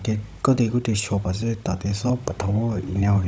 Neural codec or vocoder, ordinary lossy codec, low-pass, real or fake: codec, 16 kHz, 16 kbps, FunCodec, trained on Chinese and English, 50 frames a second; none; none; fake